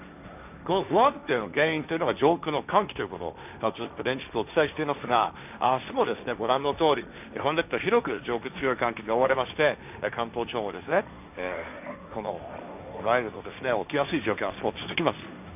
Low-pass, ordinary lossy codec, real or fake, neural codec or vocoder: 3.6 kHz; none; fake; codec, 16 kHz, 1.1 kbps, Voila-Tokenizer